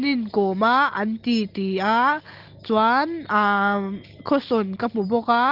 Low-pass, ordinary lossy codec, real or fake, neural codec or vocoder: 5.4 kHz; Opus, 16 kbps; real; none